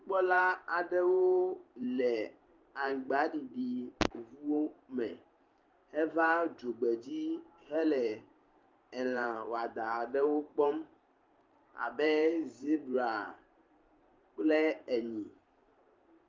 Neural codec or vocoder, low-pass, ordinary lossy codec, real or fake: vocoder, 44.1 kHz, 128 mel bands every 512 samples, BigVGAN v2; 7.2 kHz; Opus, 32 kbps; fake